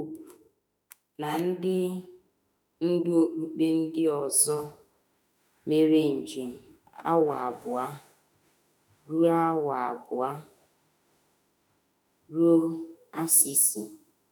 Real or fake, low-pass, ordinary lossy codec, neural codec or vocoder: fake; none; none; autoencoder, 48 kHz, 32 numbers a frame, DAC-VAE, trained on Japanese speech